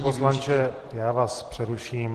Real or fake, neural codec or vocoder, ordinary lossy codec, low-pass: real; none; Opus, 16 kbps; 14.4 kHz